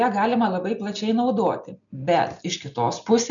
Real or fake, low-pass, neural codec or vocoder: real; 7.2 kHz; none